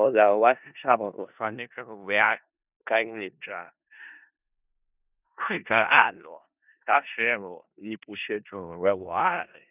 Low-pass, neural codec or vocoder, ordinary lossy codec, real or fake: 3.6 kHz; codec, 16 kHz in and 24 kHz out, 0.4 kbps, LongCat-Audio-Codec, four codebook decoder; none; fake